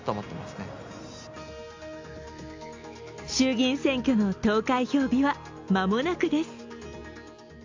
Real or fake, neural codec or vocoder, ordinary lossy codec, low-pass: real; none; AAC, 48 kbps; 7.2 kHz